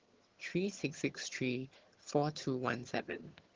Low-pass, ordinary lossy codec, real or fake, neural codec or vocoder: 7.2 kHz; Opus, 16 kbps; fake; vocoder, 22.05 kHz, 80 mel bands, HiFi-GAN